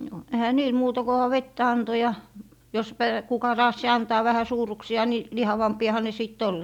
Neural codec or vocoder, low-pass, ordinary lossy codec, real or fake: none; 19.8 kHz; none; real